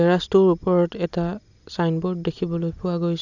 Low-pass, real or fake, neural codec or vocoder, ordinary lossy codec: 7.2 kHz; real; none; none